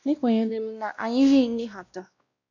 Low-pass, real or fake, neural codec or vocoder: 7.2 kHz; fake; codec, 16 kHz, 1 kbps, X-Codec, WavLM features, trained on Multilingual LibriSpeech